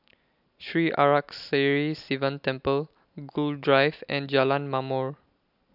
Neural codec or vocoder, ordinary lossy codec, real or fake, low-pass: none; none; real; 5.4 kHz